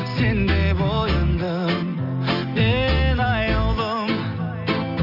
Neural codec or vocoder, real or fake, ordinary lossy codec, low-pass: none; real; none; 5.4 kHz